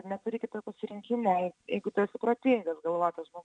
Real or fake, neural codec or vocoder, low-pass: real; none; 9.9 kHz